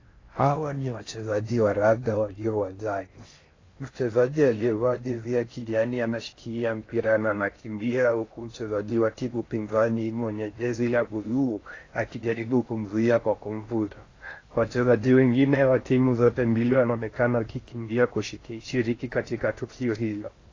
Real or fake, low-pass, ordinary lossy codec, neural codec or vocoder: fake; 7.2 kHz; AAC, 32 kbps; codec, 16 kHz in and 24 kHz out, 0.6 kbps, FocalCodec, streaming, 4096 codes